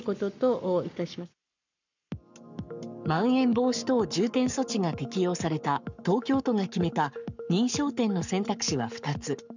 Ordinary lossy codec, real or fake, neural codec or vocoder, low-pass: none; fake; codec, 44.1 kHz, 7.8 kbps, Pupu-Codec; 7.2 kHz